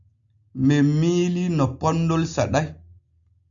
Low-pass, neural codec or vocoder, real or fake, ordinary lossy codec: 7.2 kHz; none; real; MP3, 64 kbps